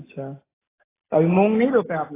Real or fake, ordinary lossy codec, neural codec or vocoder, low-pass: real; AAC, 16 kbps; none; 3.6 kHz